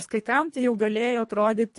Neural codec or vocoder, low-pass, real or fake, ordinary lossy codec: codec, 24 kHz, 1.5 kbps, HILCodec; 10.8 kHz; fake; MP3, 48 kbps